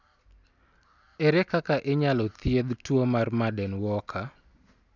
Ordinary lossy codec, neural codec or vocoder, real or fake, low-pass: none; none; real; 7.2 kHz